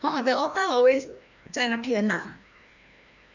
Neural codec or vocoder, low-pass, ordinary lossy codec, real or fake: codec, 16 kHz, 1 kbps, FreqCodec, larger model; 7.2 kHz; none; fake